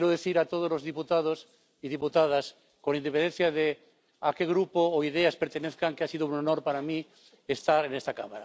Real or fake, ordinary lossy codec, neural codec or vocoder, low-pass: real; none; none; none